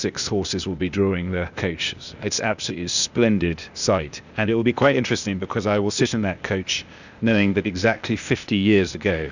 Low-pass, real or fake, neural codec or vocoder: 7.2 kHz; fake; codec, 16 kHz, 0.8 kbps, ZipCodec